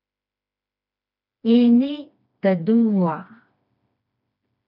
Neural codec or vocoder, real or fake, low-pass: codec, 16 kHz, 2 kbps, FreqCodec, smaller model; fake; 5.4 kHz